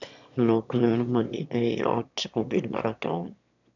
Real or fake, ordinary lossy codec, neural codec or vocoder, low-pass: fake; none; autoencoder, 22.05 kHz, a latent of 192 numbers a frame, VITS, trained on one speaker; 7.2 kHz